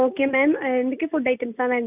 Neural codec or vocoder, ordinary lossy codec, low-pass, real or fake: none; none; 3.6 kHz; real